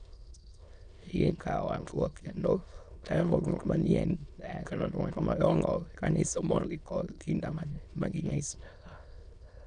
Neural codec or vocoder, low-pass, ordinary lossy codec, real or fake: autoencoder, 22.05 kHz, a latent of 192 numbers a frame, VITS, trained on many speakers; 9.9 kHz; none; fake